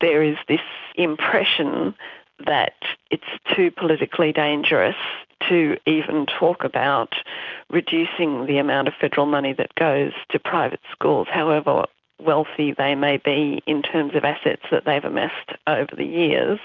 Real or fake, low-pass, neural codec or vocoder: real; 7.2 kHz; none